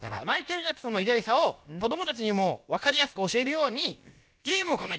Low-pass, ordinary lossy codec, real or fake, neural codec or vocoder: none; none; fake; codec, 16 kHz, about 1 kbps, DyCAST, with the encoder's durations